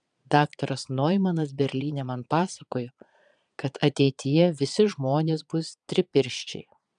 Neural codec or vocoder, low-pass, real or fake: vocoder, 22.05 kHz, 80 mel bands, Vocos; 9.9 kHz; fake